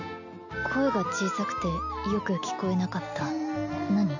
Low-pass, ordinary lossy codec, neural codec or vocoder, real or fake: 7.2 kHz; none; none; real